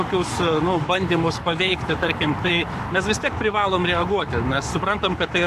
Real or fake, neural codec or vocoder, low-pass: fake; codec, 44.1 kHz, 7.8 kbps, Pupu-Codec; 14.4 kHz